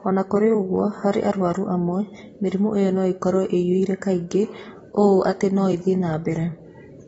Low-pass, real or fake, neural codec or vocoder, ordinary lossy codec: 19.8 kHz; fake; vocoder, 44.1 kHz, 128 mel bands every 256 samples, BigVGAN v2; AAC, 24 kbps